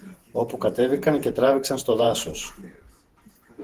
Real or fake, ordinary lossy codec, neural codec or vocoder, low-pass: fake; Opus, 16 kbps; vocoder, 48 kHz, 128 mel bands, Vocos; 14.4 kHz